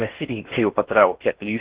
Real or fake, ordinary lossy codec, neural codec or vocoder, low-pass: fake; Opus, 16 kbps; codec, 16 kHz in and 24 kHz out, 0.6 kbps, FocalCodec, streaming, 4096 codes; 3.6 kHz